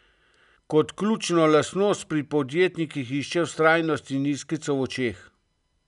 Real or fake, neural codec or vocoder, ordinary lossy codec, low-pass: real; none; none; 10.8 kHz